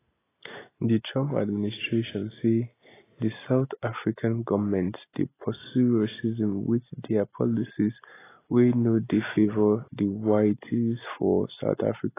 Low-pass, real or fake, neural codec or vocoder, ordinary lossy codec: 3.6 kHz; real; none; AAC, 16 kbps